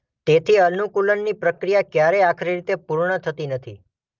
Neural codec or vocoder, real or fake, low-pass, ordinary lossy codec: none; real; 7.2 kHz; Opus, 24 kbps